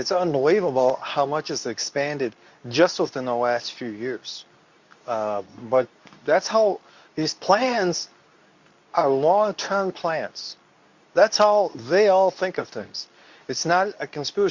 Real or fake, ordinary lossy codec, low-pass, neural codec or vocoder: fake; Opus, 64 kbps; 7.2 kHz; codec, 24 kHz, 0.9 kbps, WavTokenizer, medium speech release version 2